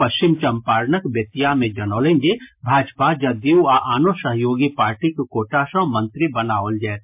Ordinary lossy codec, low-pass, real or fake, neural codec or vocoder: MP3, 32 kbps; 3.6 kHz; real; none